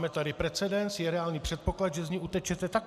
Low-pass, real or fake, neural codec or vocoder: 14.4 kHz; real; none